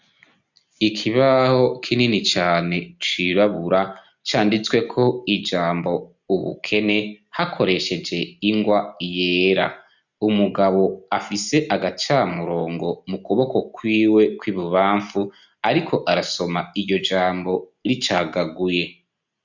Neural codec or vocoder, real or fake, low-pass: none; real; 7.2 kHz